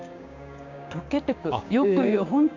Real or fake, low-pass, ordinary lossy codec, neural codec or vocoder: fake; 7.2 kHz; none; codec, 16 kHz, 6 kbps, DAC